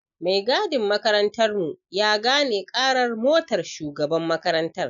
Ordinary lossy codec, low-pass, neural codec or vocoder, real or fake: none; 7.2 kHz; none; real